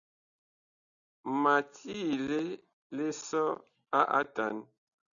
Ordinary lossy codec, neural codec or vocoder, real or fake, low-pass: MP3, 96 kbps; none; real; 7.2 kHz